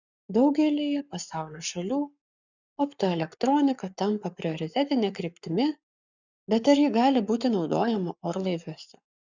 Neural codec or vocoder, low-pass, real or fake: codec, 44.1 kHz, 7.8 kbps, Pupu-Codec; 7.2 kHz; fake